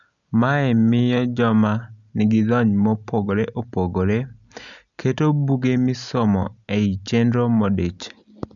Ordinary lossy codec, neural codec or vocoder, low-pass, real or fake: none; none; 7.2 kHz; real